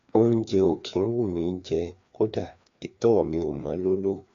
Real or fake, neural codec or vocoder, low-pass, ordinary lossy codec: fake; codec, 16 kHz, 2 kbps, FreqCodec, larger model; 7.2 kHz; AAC, 64 kbps